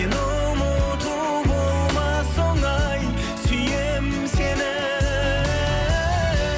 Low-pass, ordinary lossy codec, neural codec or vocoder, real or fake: none; none; none; real